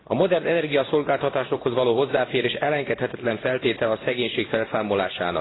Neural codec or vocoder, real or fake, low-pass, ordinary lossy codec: none; real; 7.2 kHz; AAC, 16 kbps